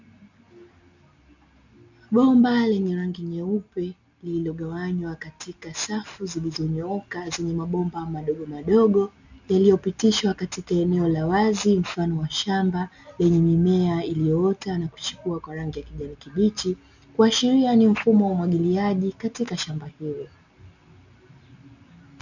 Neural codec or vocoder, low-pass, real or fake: none; 7.2 kHz; real